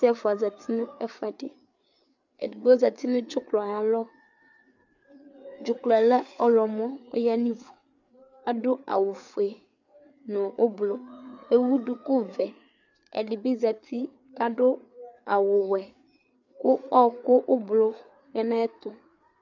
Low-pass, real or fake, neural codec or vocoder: 7.2 kHz; fake; codec, 16 kHz, 4 kbps, FreqCodec, larger model